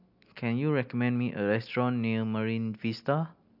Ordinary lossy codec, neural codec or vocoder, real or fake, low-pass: AAC, 48 kbps; none; real; 5.4 kHz